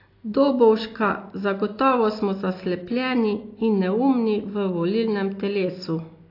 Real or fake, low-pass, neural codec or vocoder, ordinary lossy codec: real; 5.4 kHz; none; AAC, 32 kbps